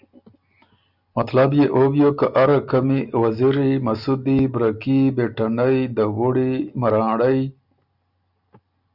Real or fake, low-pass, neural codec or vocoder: real; 5.4 kHz; none